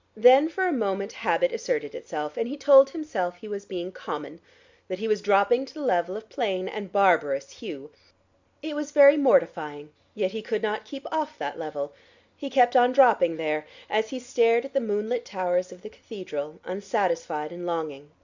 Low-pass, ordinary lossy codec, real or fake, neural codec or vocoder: 7.2 kHz; Opus, 64 kbps; real; none